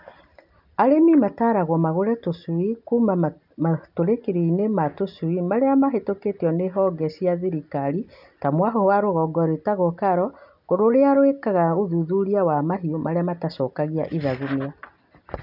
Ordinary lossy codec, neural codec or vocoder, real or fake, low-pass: none; none; real; 5.4 kHz